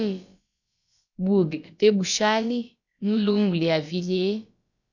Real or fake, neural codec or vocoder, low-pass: fake; codec, 16 kHz, about 1 kbps, DyCAST, with the encoder's durations; 7.2 kHz